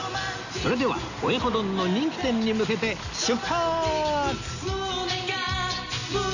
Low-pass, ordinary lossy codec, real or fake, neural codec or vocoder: 7.2 kHz; none; real; none